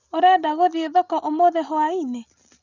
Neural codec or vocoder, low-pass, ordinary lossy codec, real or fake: none; 7.2 kHz; none; real